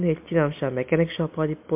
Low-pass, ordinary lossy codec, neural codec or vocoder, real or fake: 3.6 kHz; MP3, 32 kbps; none; real